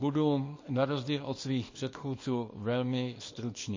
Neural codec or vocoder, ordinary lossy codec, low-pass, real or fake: codec, 24 kHz, 0.9 kbps, WavTokenizer, small release; MP3, 32 kbps; 7.2 kHz; fake